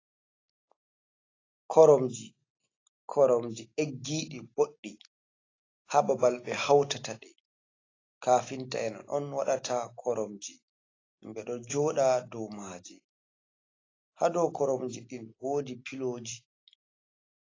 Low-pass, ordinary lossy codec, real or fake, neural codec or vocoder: 7.2 kHz; AAC, 32 kbps; real; none